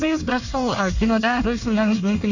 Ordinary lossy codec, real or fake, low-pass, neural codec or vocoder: none; fake; 7.2 kHz; codec, 24 kHz, 1 kbps, SNAC